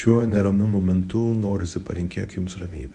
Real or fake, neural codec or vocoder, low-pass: fake; codec, 24 kHz, 0.9 kbps, WavTokenizer, medium speech release version 1; 10.8 kHz